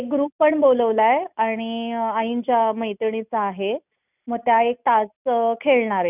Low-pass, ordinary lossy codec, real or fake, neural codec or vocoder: 3.6 kHz; none; real; none